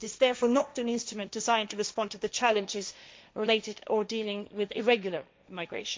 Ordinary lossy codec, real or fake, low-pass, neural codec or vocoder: none; fake; none; codec, 16 kHz, 1.1 kbps, Voila-Tokenizer